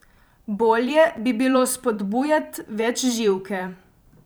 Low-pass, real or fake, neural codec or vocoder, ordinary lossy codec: none; fake; vocoder, 44.1 kHz, 128 mel bands every 512 samples, BigVGAN v2; none